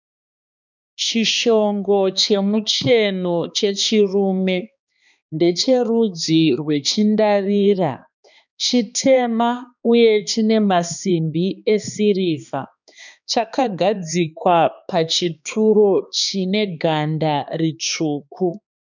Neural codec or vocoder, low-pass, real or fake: codec, 16 kHz, 2 kbps, X-Codec, HuBERT features, trained on balanced general audio; 7.2 kHz; fake